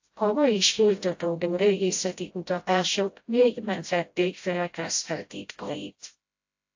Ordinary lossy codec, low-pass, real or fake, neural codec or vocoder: AAC, 48 kbps; 7.2 kHz; fake; codec, 16 kHz, 0.5 kbps, FreqCodec, smaller model